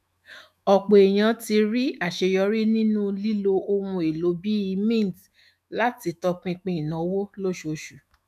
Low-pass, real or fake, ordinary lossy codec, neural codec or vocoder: 14.4 kHz; fake; none; autoencoder, 48 kHz, 128 numbers a frame, DAC-VAE, trained on Japanese speech